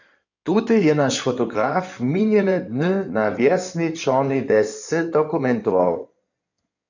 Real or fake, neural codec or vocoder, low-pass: fake; codec, 16 kHz in and 24 kHz out, 2.2 kbps, FireRedTTS-2 codec; 7.2 kHz